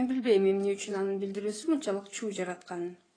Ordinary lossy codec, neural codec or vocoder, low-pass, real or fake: AAC, 32 kbps; codec, 16 kHz in and 24 kHz out, 2.2 kbps, FireRedTTS-2 codec; 9.9 kHz; fake